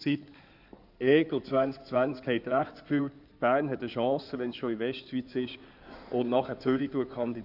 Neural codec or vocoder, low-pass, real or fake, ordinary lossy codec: codec, 16 kHz in and 24 kHz out, 2.2 kbps, FireRedTTS-2 codec; 5.4 kHz; fake; none